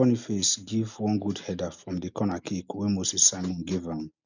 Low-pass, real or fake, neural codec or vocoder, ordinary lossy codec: 7.2 kHz; real; none; none